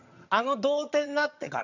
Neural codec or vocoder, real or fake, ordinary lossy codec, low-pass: vocoder, 22.05 kHz, 80 mel bands, HiFi-GAN; fake; none; 7.2 kHz